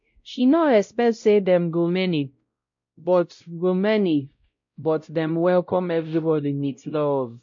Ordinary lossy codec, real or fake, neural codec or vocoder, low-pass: MP3, 48 kbps; fake; codec, 16 kHz, 0.5 kbps, X-Codec, WavLM features, trained on Multilingual LibriSpeech; 7.2 kHz